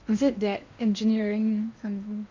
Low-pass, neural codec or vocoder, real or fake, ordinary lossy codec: 7.2 kHz; codec, 16 kHz in and 24 kHz out, 0.8 kbps, FocalCodec, streaming, 65536 codes; fake; MP3, 64 kbps